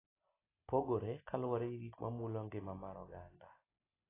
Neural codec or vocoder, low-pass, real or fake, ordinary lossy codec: none; 3.6 kHz; real; AAC, 16 kbps